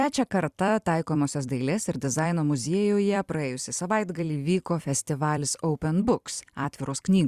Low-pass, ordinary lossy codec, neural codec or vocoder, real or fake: 14.4 kHz; Opus, 64 kbps; vocoder, 44.1 kHz, 128 mel bands every 256 samples, BigVGAN v2; fake